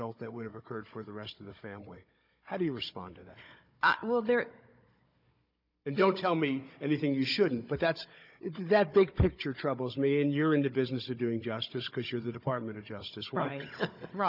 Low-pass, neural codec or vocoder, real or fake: 5.4 kHz; codec, 16 kHz, 16 kbps, FunCodec, trained on Chinese and English, 50 frames a second; fake